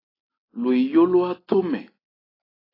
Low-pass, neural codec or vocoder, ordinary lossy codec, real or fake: 5.4 kHz; none; AAC, 24 kbps; real